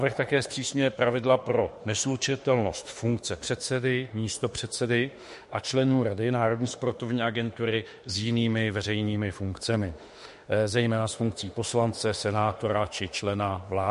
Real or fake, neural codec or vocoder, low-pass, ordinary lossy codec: fake; autoencoder, 48 kHz, 32 numbers a frame, DAC-VAE, trained on Japanese speech; 14.4 kHz; MP3, 48 kbps